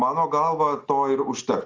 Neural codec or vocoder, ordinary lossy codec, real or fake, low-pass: none; Opus, 32 kbps; real; 7.2 kHz